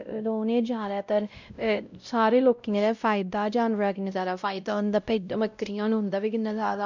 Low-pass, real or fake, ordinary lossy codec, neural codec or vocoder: 7.2 kHz; fake; none; codec, 16 kHz, 0.5 kbps, X-Codec, WavLM features, trained on Multilingual LibriSpeech